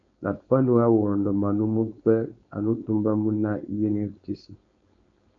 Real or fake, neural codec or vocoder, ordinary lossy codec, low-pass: fake; codec, 16 kHz, 4.8 kbps, FACodec; AAC, 48 kbps; 7.2 kHz